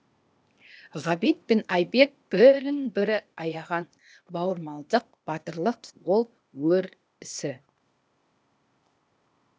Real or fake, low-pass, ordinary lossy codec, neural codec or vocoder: fake; none; none; codec, 16 kHz, 0.8 kbps, ZipCodec